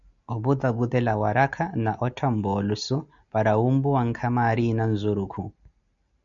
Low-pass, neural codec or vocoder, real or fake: 7.2 kHz; none; real